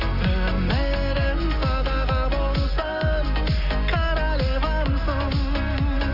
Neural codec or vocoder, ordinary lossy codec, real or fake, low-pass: none; none; real; 5.4 kHz